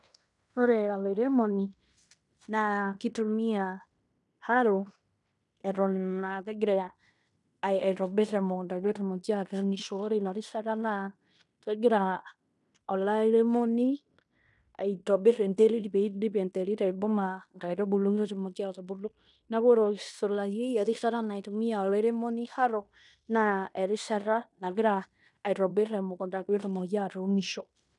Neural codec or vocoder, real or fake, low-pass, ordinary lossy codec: codec, 16 kHz in and 24 kHz out, 0.9 kbps, LongCat-Audio-Codec, fine tuned four codebook decoder; fake; 10.8 kHz; none